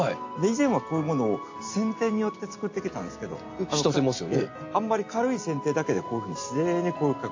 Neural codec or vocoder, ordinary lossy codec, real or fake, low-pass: none; AAC, 48 kbps; real; 7.2 kHz